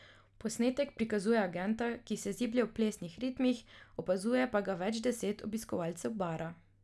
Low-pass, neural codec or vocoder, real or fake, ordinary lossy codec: none; none; real; none